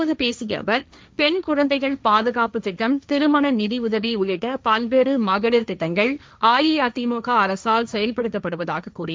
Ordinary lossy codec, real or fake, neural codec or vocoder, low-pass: none; fake; codec, 16 kHz, 1.1 kbps, Voila-Tokenizer; none